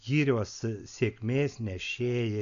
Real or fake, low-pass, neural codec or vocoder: real; 7.2 kHz; none